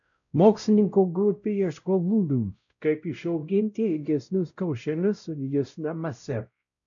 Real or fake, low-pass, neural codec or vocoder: fake; 7.2 kHz; codec, 16 kHz, 0.5 kbps, X-Codec, WavLM features, trained on Multilingual LibriSpeech